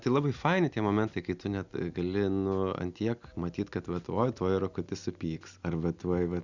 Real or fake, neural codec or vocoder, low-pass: real; none; 7.2 kHz